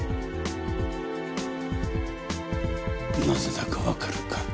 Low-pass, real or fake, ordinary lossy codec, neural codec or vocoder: none; real; none; none